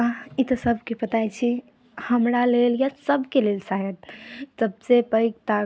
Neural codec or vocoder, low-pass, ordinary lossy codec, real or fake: none; none; none; real